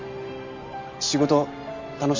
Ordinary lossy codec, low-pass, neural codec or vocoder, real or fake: MP3, 48 kbps; 7.2 kHz; none; real